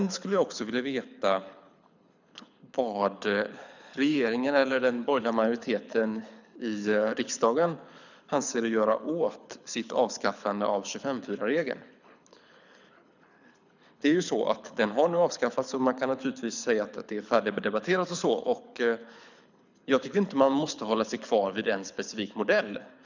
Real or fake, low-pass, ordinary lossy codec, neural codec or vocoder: fake; 7.2 kHz; none; codec, 24 kHz, 6 kbps, HILCodec